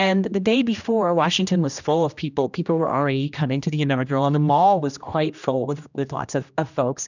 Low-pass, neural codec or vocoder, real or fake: 7.2 kHz; codec, 16 kHz, 1 kbps, X-Codec, HuBERT features, trained on general audio; fake